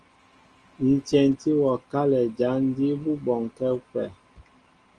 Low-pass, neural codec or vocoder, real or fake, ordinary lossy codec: 9.9 kHz; none; real; Opus, 24 kbps